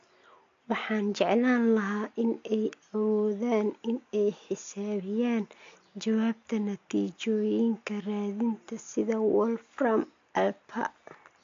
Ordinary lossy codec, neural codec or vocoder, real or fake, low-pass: AAC, 48 kbps; none; real; 7.2 kHz